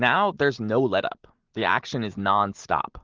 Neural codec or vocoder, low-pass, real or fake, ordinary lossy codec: none; 7.2 kHz; real; Opus, 16 kbps